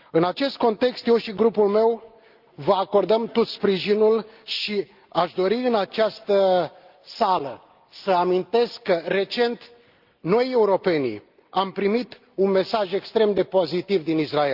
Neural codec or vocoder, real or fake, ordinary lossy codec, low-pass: none; real; Opus, 24 kbps; 5.4 kHz